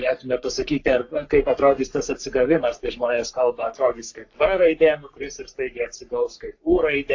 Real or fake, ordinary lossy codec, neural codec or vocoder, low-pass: fake; AAC, 48 kbps; codec, 44.1 kHz, 3.4 kbps, Pupu-Codec; 7.2 kHz